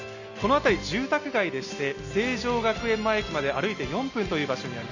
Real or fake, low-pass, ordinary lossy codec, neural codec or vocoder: real; 7.2 kHz; none; none